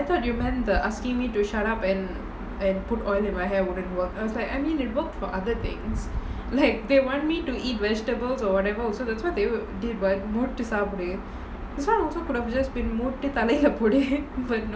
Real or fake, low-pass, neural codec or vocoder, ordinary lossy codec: real; none; none; none